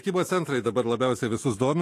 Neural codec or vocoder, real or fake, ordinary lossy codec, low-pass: autoencoder, 48 kHz, 128 numbers a frame, DAC-VAE, trained on Japanese speech; fake; AAC, 48 kbps; 14.4 kHz